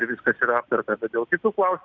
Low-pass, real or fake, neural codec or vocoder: 7.2 kHz; real; none